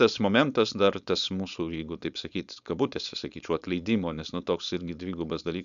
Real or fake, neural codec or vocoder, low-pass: fake; codec, 16 kHz, 4.8 kbps, FACodec; 7.2 kHz